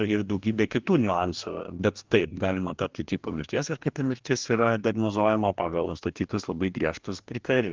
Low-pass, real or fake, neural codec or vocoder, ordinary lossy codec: 7.2 kHz; fake; codec, 44.1 kHz, 2.6 kbps, DAC; Opus, 24 kbps